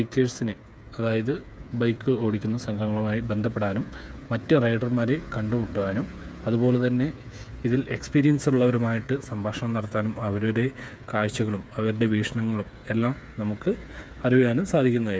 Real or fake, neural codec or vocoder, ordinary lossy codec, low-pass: fake; codec, 16 kHz, 8 kbps, FreqCodec, smaller model; none; none